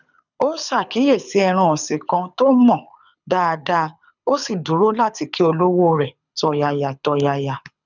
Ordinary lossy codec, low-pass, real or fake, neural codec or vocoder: none; 7.2 kHz; fake; codec, 24 kHz, 6 kbps, HILCodec